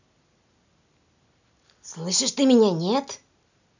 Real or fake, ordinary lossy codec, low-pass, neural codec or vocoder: real; none; 7.2 kHz; none